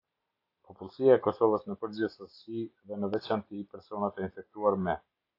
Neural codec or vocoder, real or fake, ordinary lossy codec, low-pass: none; real; AAC, 32 kbps; 5.4 kHz